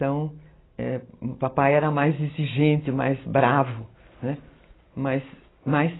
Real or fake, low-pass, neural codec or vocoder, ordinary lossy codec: real; 7.2 kHz; none; AAC, 16 kbps